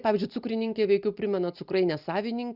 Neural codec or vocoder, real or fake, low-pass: none; real; 5.4 kHz